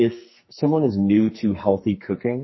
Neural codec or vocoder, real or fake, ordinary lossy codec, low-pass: codec, 44.1 kHz, 2.6 kbps, SNAC; fake; MP3, 24 kbps; 7.2 kHz